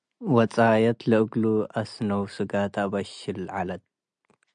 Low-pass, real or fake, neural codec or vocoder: 9.9 kHz; real; none